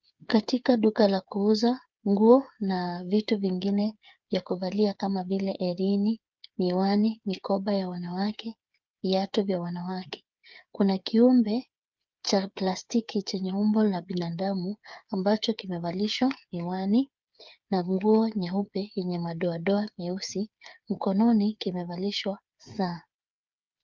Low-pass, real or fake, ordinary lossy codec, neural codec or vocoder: 7.2 kHz; fake; Opus, 24 kbps; codec, 16 kHz, 8 kbps, FreqCodec, smaller model